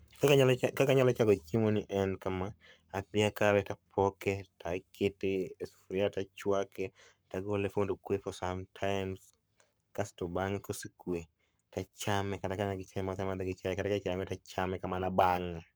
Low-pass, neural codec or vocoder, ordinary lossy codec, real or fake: none; codec, 44.1 kHz, 7.8 kbps, Pupu-Codec; none; fake